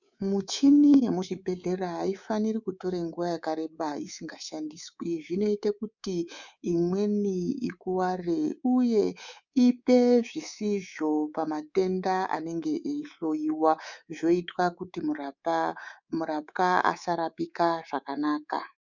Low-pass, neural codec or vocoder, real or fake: 7.2 kHz; codec, 24 kHz, 3.1 kbps, DualCodec; fake